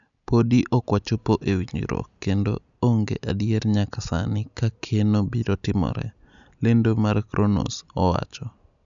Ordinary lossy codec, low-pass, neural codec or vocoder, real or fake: none; 7.2 kHz; none; real